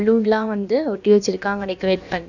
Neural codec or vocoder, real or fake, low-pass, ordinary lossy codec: codec, 16 kHz, about 1 kbps, DyCAST, with the encoder's durations; fake; 7.2 kHz; none